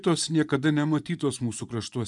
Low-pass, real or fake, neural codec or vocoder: 10.8 kHz; fake; vocoder, 24 kHz, 100 mel bands, Vocos